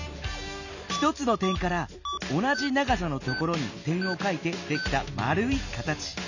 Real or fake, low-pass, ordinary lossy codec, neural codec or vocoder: real; 7.2 kHz; none; none